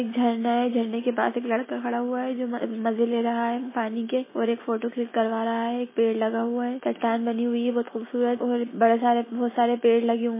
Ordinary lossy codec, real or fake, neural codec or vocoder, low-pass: MP3, 16 kbps; real; none; 3.6 kHz